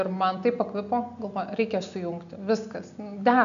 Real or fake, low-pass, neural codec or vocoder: real; 7.2 kHz; none